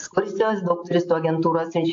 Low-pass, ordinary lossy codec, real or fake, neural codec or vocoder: 7.2 kHz; AAC, 48 kbps; real; none